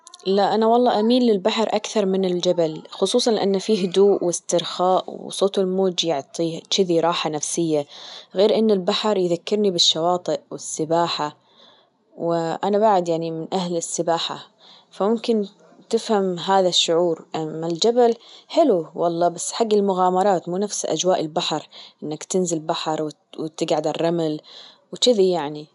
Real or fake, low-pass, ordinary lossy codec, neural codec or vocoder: real; 10.8 kHz; none; none